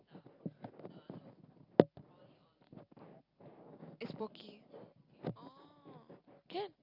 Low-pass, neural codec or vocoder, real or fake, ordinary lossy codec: 5.4 kHz; none; real; Opus, 64 kbps